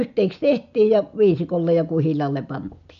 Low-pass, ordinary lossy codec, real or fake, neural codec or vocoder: 7.2 kHz; none; real; none